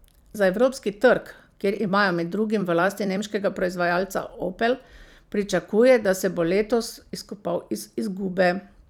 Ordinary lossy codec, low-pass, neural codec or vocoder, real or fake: none; 19.8 kHz; vocoder, 44.1 kHz, 128 mel bands every 256 samples, BigVGAN v2; fake